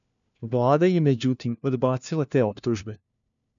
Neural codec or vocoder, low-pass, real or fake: codec, 16 kHz, 1 kbps, FunCodec, trained on LibriTTS, 50 frames a second; 7.2 kHz; fake